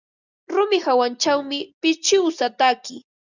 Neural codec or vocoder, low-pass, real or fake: none; 7.2 kHz; real